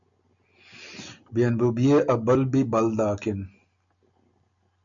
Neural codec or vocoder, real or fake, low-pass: none; real; 7.2 kHz